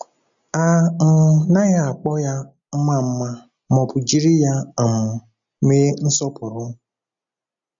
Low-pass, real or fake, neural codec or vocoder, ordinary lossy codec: 7.2 kHz; real; none; none